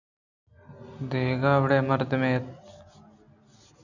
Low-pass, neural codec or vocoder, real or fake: 7.2 kHz; none; real